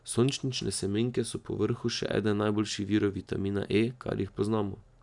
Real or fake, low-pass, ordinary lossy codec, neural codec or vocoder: real; 10.8 kHz; none; none